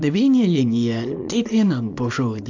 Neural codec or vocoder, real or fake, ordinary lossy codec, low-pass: codec, 24 kHz, 0.9 kbps, WavTokenizer, small release; fake; AAC, 48 kbps; 7.2 kHz